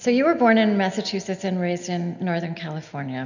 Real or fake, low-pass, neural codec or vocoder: real; 7.2 kHz; none